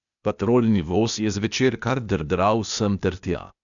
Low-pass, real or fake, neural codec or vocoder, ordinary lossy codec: 7.2 kHz; fake; codec, 16 kHz, 0.8 kbps, ZipCodec; none